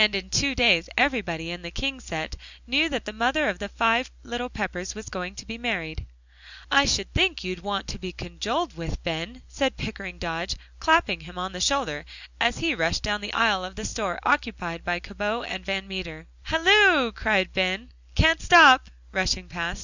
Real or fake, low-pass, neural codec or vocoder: real; 7.2 kHz; none